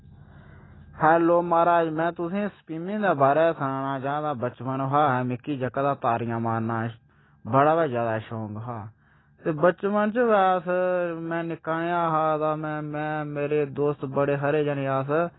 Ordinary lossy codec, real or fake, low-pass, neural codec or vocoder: AAC, 16 kbps; real; 7.2 kHz; none